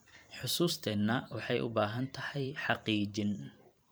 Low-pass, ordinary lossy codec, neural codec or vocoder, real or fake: none; none; vocoder, 44.1 kHz, 128 mel bands every 512 samples, BigVGAN v2; fake